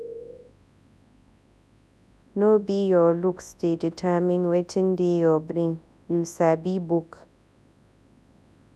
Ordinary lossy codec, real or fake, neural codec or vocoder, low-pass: none; fake; codec, 24 kHz, 0.9 kbps, WavTokenizer, large speech release; none